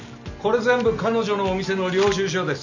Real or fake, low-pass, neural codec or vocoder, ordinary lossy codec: real; 7.2 kHz; none; none